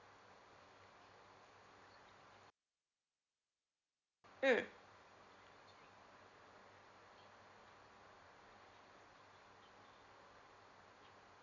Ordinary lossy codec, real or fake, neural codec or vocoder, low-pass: none; real; none; 7.2 kHz